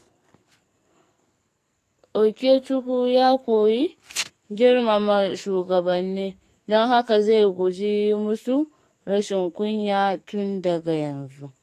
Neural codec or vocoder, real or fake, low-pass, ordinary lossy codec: codec, 44.1 kHz, 2.6 kbps, SNAC; fake; 14.4 kHz; AAC, 64 kbps